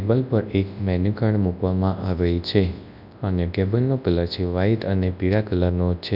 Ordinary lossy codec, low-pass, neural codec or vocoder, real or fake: none; 5.4 kHz; codec, 24 kHz, 0.9 kbps, WavTokenizer, large speech release; fake